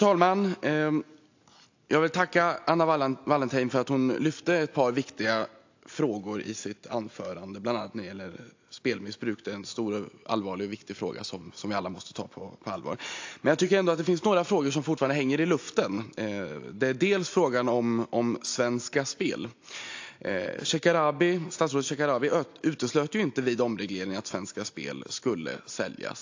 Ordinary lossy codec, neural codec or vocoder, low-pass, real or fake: AAC, 48 kbps; none; 7.2 kHz; real